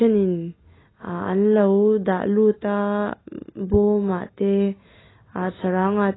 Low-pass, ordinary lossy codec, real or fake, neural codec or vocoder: 7.2 kHz; AAC, 16 kbps; real; none